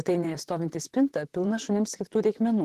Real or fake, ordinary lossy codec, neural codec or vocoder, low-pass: fake; Opus, 16 kbps; vocoder, 44.1 kHz, 128 mel bands, Pupu-Vocoder; 14.4 kHz